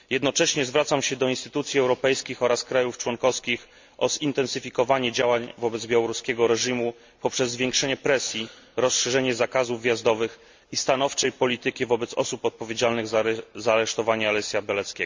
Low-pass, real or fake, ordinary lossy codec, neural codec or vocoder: 7.2 kHz; real; MP3, 64 kbps; none